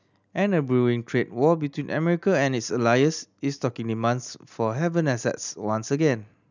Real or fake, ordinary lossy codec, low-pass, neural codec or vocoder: real; none; 7.2 kHz; none